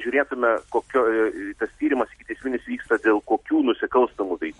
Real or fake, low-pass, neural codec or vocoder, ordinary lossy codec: real; 19.8 kHz; none; MP3, 48 kbps